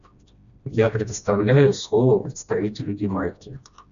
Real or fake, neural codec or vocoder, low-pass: fake; codec, 16 kHz, 1 kbps, FreqCodec, smaller model; 7.2 kHz